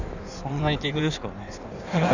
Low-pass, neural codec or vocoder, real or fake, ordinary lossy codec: 7.2 kHz; codec, 16 kHz in and 24 kHz out, 1.1 kbps, FireRedTTS-2 codec; fake; none